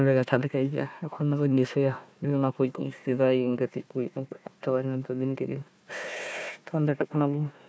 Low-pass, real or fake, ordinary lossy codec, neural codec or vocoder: none; fake; none; codec, 16 kHz, 1 kbps, FunCodec, trained on Chinese and English, 50 frames a second